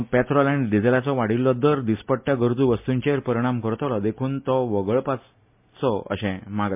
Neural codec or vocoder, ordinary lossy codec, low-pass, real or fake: none; MP3, 32 kbps; 3.6 kHz; real